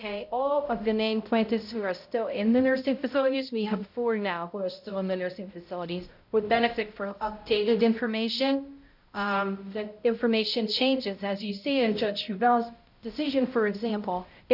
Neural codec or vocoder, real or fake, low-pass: codec, 16 kHz, 0.5 kbps, X-Codec, HuBERT features, trained on balanced general audio; fake; 5.4 kHz